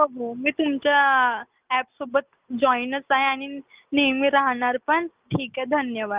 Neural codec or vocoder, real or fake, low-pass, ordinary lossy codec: none; real; 3.6 kHz; Opus, 32 kbps